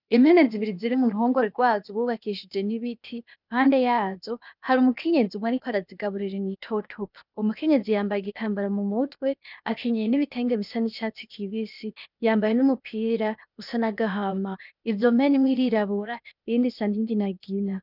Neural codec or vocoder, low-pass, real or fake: codec, 16 kHz, 0.8 kbps, ZipCodec; 5.4 kHz; fake